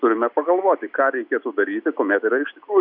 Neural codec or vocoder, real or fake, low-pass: none; real; 5.4 kHz